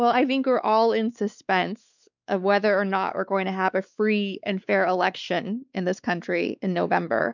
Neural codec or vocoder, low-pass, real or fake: codec, 16 kHz, 4 kbps, X-Codec, WavLM features, trained on Multilingual LibriSpeech; 7.2 kHz; fake